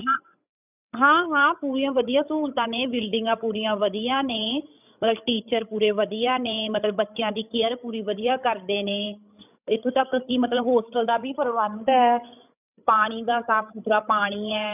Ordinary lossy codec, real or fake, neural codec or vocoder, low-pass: none; fake; codec, 16 kHz, 16 kbps, FreqCodec, larger model; 3.6 kHz